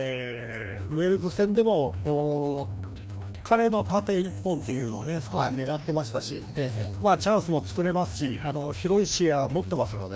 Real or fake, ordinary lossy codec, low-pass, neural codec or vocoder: fake; none; none; codec, 16 kHz, 1 kbps, FreqCodec, larger model